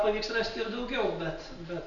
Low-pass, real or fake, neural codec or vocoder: 7.2 kHz; real; none